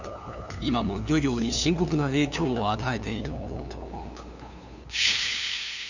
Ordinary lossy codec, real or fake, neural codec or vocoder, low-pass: none; fake; codec, 16 kHz, 2 kbps, FunCodec, trained on LibriTTS, 25 frames a second; 7.2 kHz